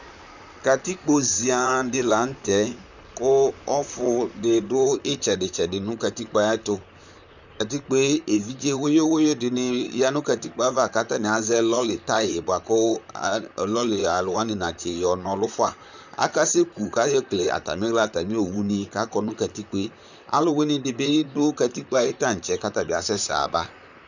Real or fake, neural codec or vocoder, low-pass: fake; vocoder, 44.1 kHz, 128 mel bands, Pupu-Vocoder; 7.2 kHz